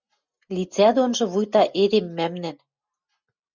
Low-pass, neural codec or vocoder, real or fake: 7.2 kHz; none; real